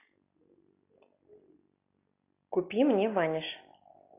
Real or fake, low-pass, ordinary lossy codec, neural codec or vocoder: real; 3.6 kHz; AAC, 24 kbps; none